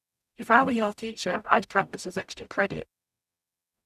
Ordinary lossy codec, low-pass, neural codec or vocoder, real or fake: none; 14.4 kHz; codec, 44.1 kHz, 0.9 kbps, DAC; fake